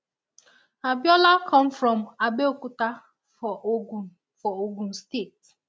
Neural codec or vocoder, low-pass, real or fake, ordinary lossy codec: none; none; real; none